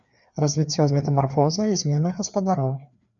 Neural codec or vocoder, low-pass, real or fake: codec, 16 kHz, 2 kbps, FreqCodec, larger model; 7.2 kHz; fake